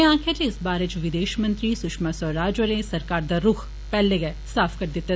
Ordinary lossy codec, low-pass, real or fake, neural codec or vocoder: none; none; real; none